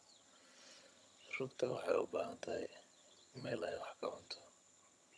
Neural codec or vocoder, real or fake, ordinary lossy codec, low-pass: vocoder, 22.05 kHz, 80 mel bands, HiFi-GAN; fake; none; none